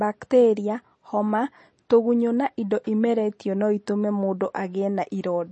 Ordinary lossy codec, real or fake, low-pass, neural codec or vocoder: MP3, 32 kbps; real; 10.8 kHz; none